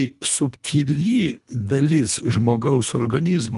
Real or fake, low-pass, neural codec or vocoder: fake; 10.8 kHz; codec, 24 kHz, 1.5 kbps, HILCodec